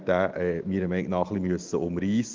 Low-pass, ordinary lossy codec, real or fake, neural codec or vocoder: 7.2 kHz; Opus, 32 kbps; real; none